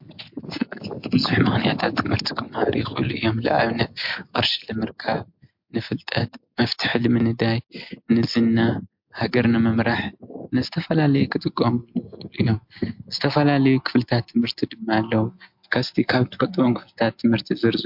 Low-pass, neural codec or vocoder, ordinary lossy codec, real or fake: 5.4 kHz; none; MP3, 48 kbps; real